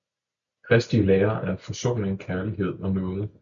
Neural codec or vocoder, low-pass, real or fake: none; 7.2 kHz; real